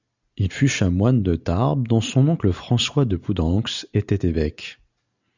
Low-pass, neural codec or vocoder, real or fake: 7.2 kHz; none; real